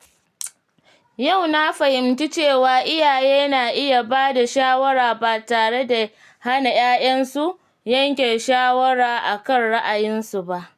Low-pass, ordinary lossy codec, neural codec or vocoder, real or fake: 14.4 kHz; none; none; real